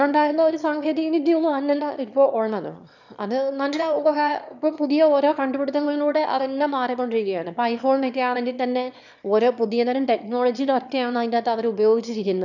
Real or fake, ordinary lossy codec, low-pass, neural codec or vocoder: fake; none; 7.2 kHz; autoencoder, 22.05 kHz, a latent of 192 numbers a frame, VITS, trained on one speaker